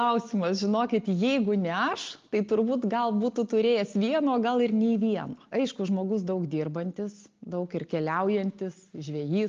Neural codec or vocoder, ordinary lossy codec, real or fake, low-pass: none; Opus, 32 kbps; real; 7.2 kHz